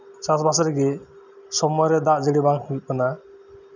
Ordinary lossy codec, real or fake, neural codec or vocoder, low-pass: none; real; none; 7.2 kHz